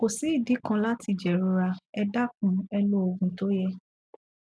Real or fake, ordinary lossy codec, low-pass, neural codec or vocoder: real; none; none; none